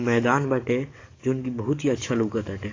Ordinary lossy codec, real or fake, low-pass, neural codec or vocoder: AAC, 32 kbps; fake; 7.2 kHz; codec, 44.1 kHz, 7.8 kbps, DAC